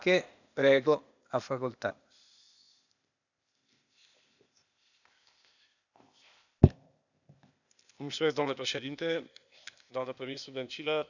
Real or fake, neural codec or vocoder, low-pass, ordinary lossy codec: fake; codec, 16 kHz, 0.8 kbps, ZipCodec; 7.2 kHz; none